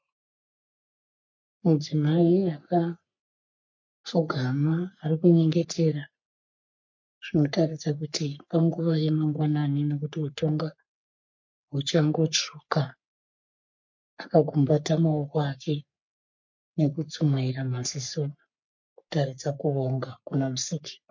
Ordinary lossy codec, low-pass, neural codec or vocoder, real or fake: MP3, 48 kbps; 7.2 kHz; codec, 44.1 kHz, 3.4 kbps, Pupu-Codec; fake